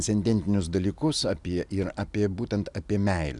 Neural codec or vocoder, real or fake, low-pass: none; real; 10.8 kHz